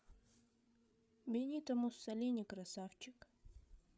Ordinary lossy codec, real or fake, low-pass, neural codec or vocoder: none; fake; none; codec, 16 kHz, 8 kbps, FreqCodec, larger model